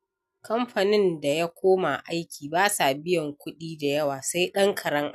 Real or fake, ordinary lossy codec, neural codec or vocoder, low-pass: real; none; none; 14.4 kHz